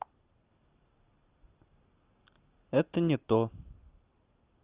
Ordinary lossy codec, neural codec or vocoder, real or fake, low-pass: Opus, 24 kbps; none; real; 3.6 kHz